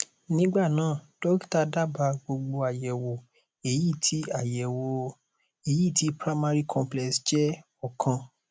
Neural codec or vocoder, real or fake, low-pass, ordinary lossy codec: none; real; none; none